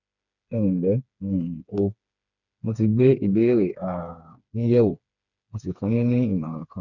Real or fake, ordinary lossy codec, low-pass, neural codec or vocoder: fake; none; 7.2 kHz; codec, 16 kHz, 4 kbps, FreqCodec, smaller model